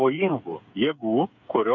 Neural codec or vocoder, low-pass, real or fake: codec, 16 kHz, 8 kbps, FreqCodec, smaller model; 7.2 kHz; fake